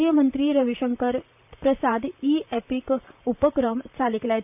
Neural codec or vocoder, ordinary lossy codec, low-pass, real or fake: vocoder, 44.1 kHz, 128 mel bands, Pupu-Vocoder; none; 3.6 kHz; fake